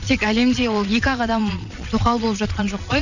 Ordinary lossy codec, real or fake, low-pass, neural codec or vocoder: none; fake; 7.2 kHz; vocoder, 22.05 kHz, 80 mel bands, Vocos